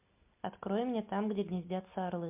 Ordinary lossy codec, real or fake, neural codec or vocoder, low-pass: MP3, 32 kbps; real; none; 3.6 kHz